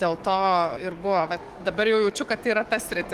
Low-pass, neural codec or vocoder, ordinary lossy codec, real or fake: 14.4 kHz; codec, 44.1 kHz, 7.8 kbps, DAC; Opus, 24 kbps; fake